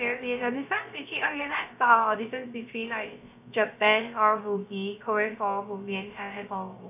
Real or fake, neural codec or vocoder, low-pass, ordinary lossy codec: fake; codec, 16 kHz, 0.3 kbps, FocalCodec; 3.6 kHz; none